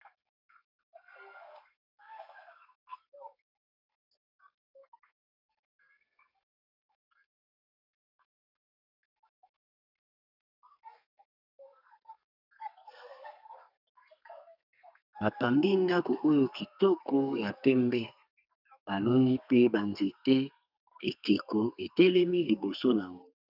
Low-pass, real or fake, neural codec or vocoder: 5.4 kHz; fake; codec, 32 kHz, 1.9 kbps, SNAC